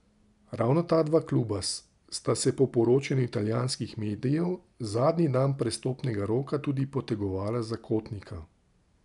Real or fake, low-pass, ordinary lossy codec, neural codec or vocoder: real; 10.8 kHz; none; none